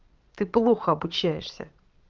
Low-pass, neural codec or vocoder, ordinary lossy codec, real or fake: 7.2 kHz; vocoder, 22.05 kHz, 80 mel bands, Vocos; Opus, 32 kbps; fake